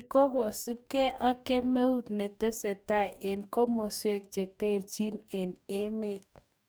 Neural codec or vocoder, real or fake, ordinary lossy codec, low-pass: codec, 44.1 kHz, 2.6 kbps, DAC; fake; none; none